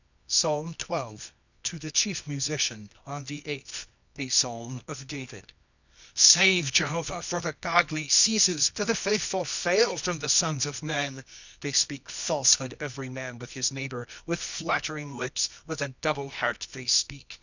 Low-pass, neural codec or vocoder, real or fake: 7.2 kHz; codec, 24 kHz, 0.9 kbps, WavTokenizer, medium music audio release; fake